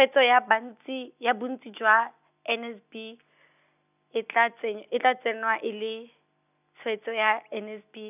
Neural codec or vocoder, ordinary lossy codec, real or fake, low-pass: none; none; real; 3.6 kHz